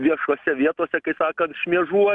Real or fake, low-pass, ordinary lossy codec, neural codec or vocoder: real; 10.8 kHz; MP3, 96 kbps; none